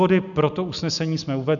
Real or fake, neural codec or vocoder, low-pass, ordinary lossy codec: real; none; 7.2 kHz; MP3, 96 kbps